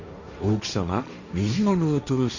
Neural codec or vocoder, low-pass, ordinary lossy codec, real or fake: codec, 16 kHz, 1.1 kbps, Voila-Tokenizer; 7.2 kHz; none; fake